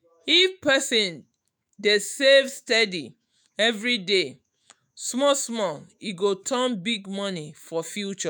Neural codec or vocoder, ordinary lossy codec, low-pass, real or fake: autoencoder, 48 kHz, 128 numbers a frame, DAC-VAE, trained on Japanese speech; none; none; fake